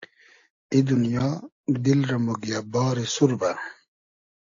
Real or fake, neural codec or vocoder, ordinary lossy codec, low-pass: real; none; AAC, 48 kbps; 7.2 kHz